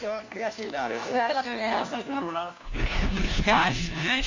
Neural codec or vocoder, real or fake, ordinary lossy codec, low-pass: codec, 16 kHz, 1 kbps, FunCodec, trained on LibriTTS, 50 frames a second; fake; AAC, 48 kbps; 7.2 kHz